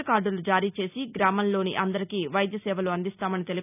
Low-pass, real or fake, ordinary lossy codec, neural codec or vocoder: 3.6 kHz; real; none; none